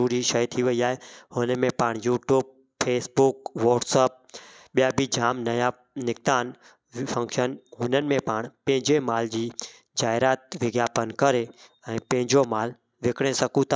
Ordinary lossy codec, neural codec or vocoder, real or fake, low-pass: none; none; real; none